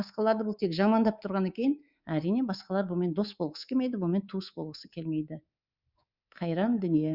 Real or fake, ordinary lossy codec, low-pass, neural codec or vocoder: fake; Opus, 64 kbps; 5.4 kHz; codec, 24 kHz, 3.1 kbps, DualCodec